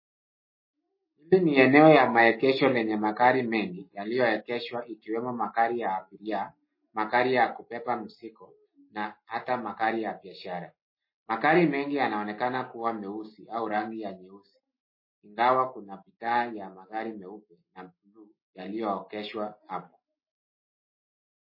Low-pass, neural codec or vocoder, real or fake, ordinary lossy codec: 5.4 kHz; none; real; MP3, 24 kbps